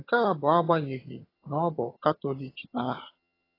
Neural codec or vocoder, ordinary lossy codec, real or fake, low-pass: vocoder, 22.05 kHz, 80 mel bands, HiFi-GAN; AAC, 24 kbps; fake; 5.4 kHz